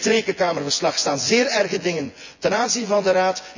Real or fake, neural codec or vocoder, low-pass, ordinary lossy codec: fake; vocoder, 24 kHz, 100 mel bands, Vocos; 7.2 kHz; none